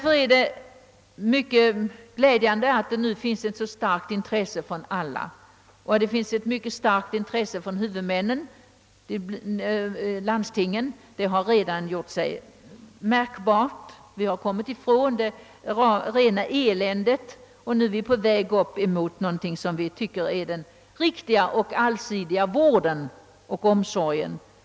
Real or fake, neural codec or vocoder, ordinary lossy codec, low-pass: real; none; none; none